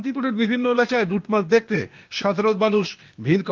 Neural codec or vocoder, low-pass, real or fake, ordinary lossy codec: codec, 16 kHz, 0.8 kbps, ZipCodec; 7.2 kHz; fake; Opus, 24 kbps